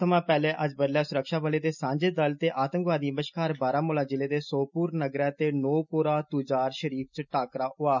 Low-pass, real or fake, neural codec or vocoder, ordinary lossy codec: 7.2 kHz; real; none; none